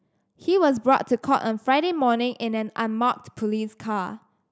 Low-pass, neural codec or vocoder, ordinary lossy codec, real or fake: none; none; none; real